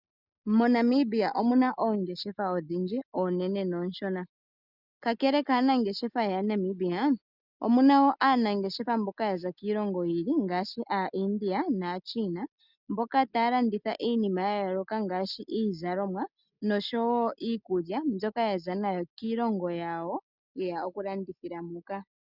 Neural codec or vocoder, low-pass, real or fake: none; 5.4 kHz; real